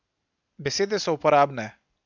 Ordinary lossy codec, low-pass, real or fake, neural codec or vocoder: none; 7.2 kHz; real; none